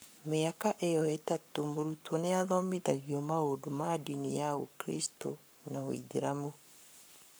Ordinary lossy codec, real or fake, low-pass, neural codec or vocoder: none; fake; none; codec, 44.1 kHz, 7.8 kbps, Pupu-Codec